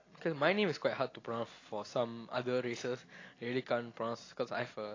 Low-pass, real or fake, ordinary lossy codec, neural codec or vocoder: 7.2 kHz; real; AAC, 32 kbps; none